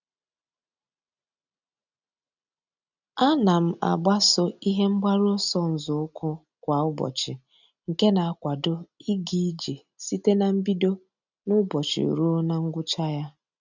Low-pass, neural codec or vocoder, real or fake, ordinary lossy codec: 7.2 kHz; none; real; none